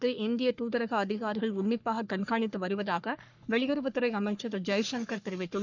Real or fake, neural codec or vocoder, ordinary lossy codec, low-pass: fake; codec, 44.1 kHz, 3.4 kbps, Pupu-Codec; none; 7.2 kHz